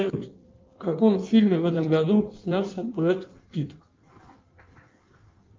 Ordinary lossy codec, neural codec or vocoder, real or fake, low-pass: Opus, 24 kbps; codec, 16 kHz in and 24 kHz out, 1.1 kbps, FireRedTTS-2 codec; fake; 7.2 kHz